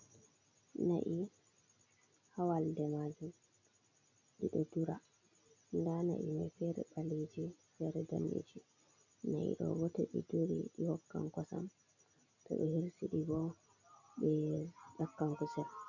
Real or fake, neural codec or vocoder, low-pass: real; none; 7.2 kHz